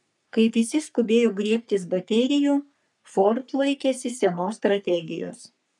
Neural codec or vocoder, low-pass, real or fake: codec, 44.1 kHz, 3.4 kbps, Pupu-Codec; 10.8 kHz; fake